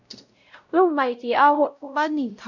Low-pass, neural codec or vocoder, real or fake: 7.2 kHz; codec, 16 kHz, 0.5 kbps, X-Codec, WavLM features, trained on Multilingual LibriSpeech; fake